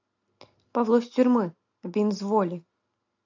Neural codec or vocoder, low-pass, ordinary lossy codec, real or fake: none; 7.2 kHz; AAC, 48 kbps; real